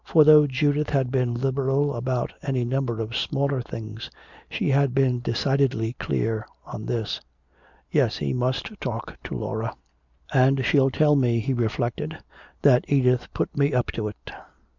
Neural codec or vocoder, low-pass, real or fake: none; 7.2 kHz; real